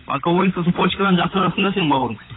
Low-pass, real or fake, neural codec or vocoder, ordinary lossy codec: 7.2 kHz; fake; codec, 16 kHz, 16 kbps, FunCodec, trained on LibriTTS, 50 frames a second; AAC, 16 kbps